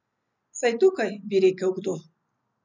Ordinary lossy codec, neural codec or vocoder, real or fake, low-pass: none; none; real; 7.2 kHz